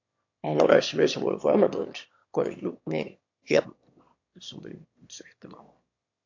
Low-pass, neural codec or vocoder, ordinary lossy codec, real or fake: 7.2 kHz; autoencoder, 22.05 kHz, a latent of 192 numbers a frame, VITS, trained on one speaker; AAC, 48 kbps; fake